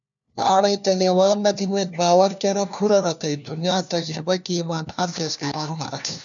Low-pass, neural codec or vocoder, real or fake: 7.2 kHz; codec, 16 kHz, 1 kbps, FunCodec, trained on LibriTTS, 50 frames a second; fake